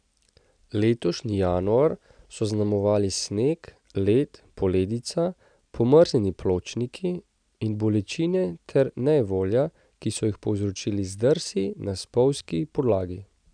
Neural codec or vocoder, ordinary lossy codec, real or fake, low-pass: none; none; real; 9.9 kHz